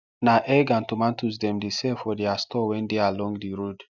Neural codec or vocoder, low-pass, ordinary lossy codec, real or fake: none; 7.2 kHz; none; real